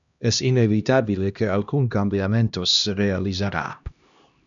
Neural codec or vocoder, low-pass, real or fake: codec, 16 kHz, 1 kbps, X-Codec, HuBERT features, trained on LibriSpeech; 7.2 kHz; fake